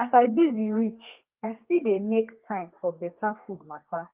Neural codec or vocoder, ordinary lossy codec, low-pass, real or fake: codec, 32 kHz, 1.9 kbps, SNAC; Opus, 32 kbps; 3.6 kHz; fake